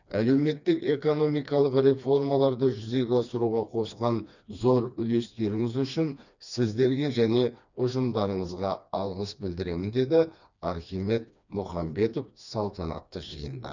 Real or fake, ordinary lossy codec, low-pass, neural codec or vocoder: fake; none; 7.2 kHz; codec, 16 kHz, 2 kbps, FreqCodec, smaller model